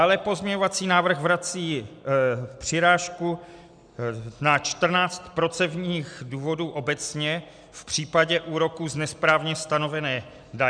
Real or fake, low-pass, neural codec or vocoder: real; 9.9 kHz; none